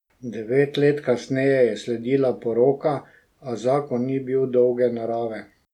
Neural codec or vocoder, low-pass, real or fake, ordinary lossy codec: none; 19.8 kHz; real; none